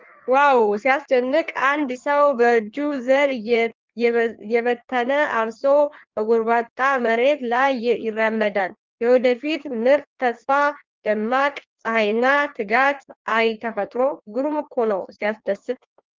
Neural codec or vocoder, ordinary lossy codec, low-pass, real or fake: codec, 16 kHz in and 24 kHz out, 1.1 kbps, FireRedTTS-2 codec; Opus, 32 kbps; 7.2 kHz; fake